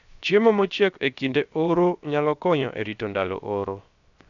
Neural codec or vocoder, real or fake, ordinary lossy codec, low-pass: codec, 16 kHz, 0.7 kbps, FocalCodec; fake; none; 7.2 kHz